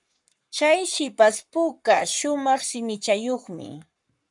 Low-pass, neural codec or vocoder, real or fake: 10.8 kHz; codec, 44.1 kHz, 7.8 kbps, Pupu-Codec; fake